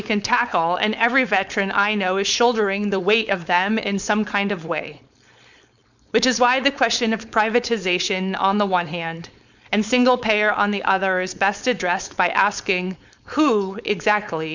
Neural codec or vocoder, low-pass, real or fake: codec, 16 kHz, 4.8 kbps, FACodec; 7.2 kHz; fake